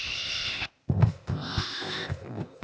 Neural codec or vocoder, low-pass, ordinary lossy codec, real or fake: codec, 16 kHz, 0.8 kbps, ZipCodec; none; none; fake